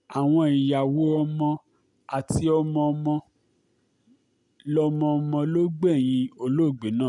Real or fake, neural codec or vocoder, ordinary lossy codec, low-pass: real; none; none; 10.8 kHz